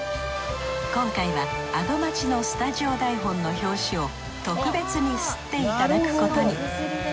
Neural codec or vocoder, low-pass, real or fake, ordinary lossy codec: none; none; real; none